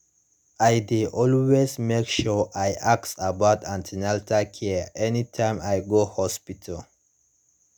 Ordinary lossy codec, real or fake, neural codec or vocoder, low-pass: none; real; none; none